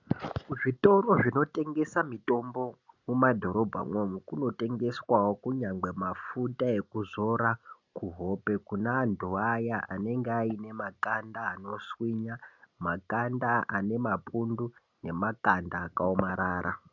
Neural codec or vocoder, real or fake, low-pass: none; real; 7.2 kHz